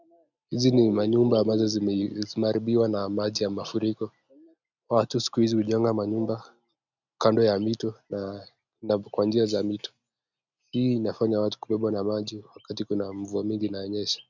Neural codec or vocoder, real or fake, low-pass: none; real; 7.2 kHz